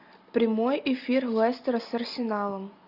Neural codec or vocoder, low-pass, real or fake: none; 5.4 kHz; real